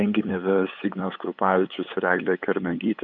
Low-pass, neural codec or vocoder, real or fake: 7.2 kHz; codec, 16 kHz, 8 kbps, FunCodec, trained on LibriTTS, 25 frames a second; fake